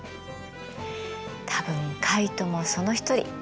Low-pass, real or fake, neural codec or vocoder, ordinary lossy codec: none; real; none; none